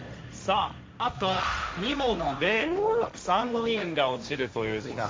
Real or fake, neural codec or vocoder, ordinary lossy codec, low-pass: fake; codec, 16 kHz, 1.1 kbps, Voila-Tokenizer; none; none